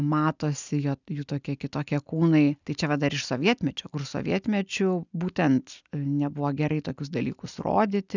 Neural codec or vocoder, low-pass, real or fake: none; 7.2 kHz; real